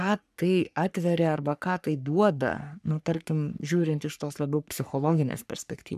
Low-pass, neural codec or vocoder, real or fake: 14.4 kHz; codec, 44.1 kHz, 3.4 kbps, Pupu-Codec; fake